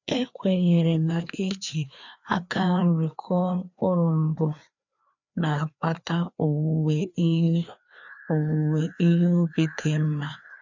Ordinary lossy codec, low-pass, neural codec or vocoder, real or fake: none; 7.2 kHz; codec, 16 kHz, 2 kbps, FreqCodec, larger model; fake